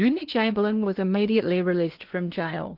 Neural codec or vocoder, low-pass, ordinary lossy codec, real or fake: codec, 16 kHz in and 24 kHz out, 0.8 kbps, FocalCodec, streaming, 65536 codes; 5.4 kHz; Opus, 24 kbps; fake